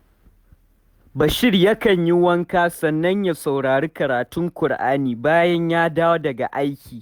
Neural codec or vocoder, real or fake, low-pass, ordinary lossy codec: none; real; 19.8 kHz; Opus, 64 kbps